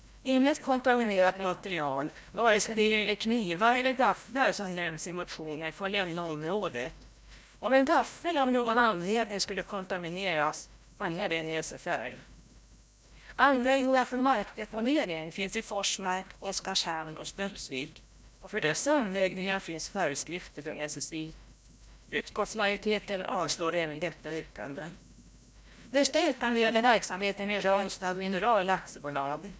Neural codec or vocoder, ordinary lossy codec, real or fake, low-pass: codec, 16 kHz, 0.5 kbps, FreqCodec, larger model; none; fake; none